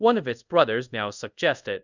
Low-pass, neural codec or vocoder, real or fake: 7.2 kHz; codec, 24 kHz, 0.5 kbps, DualCodec; fake